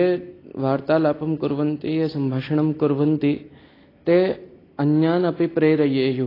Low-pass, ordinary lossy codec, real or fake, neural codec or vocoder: 5.4 kHz; AAC, 24 kbps; real; none